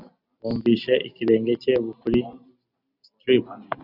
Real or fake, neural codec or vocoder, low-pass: real; none; 5.4 kHz